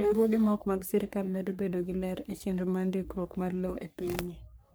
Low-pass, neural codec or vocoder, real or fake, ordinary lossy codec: none; codec, 44.1 kHz, 3.4 kbps, Pupu-Codec; fake; none